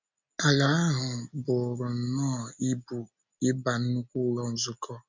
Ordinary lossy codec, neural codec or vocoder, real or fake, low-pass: MP3, 64 kbps; none; real; 7.2 kHz